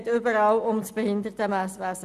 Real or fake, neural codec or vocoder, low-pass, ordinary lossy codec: real; none; 14.4 kHz; none